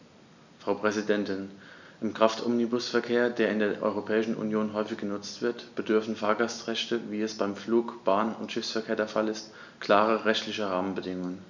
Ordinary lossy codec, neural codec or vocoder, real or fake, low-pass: none; none; real; 7.2 kHz